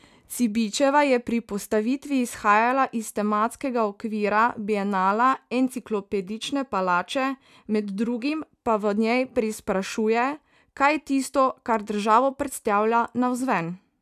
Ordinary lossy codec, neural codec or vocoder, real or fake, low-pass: none; none; real; 14.4 kHz